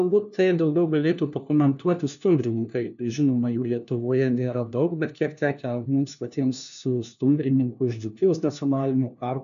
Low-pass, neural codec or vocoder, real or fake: 7.2 kHz; codec, 16 kHz, 1 kbps, FunCodec, trained on LibriTTS, 50 frames a second; fake